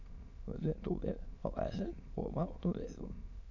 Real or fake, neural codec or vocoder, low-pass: fake; autoencoder, 22.05 kHz, a latent of 192 numbers a frame, VITS, trained on many speakers; 7.2 kHz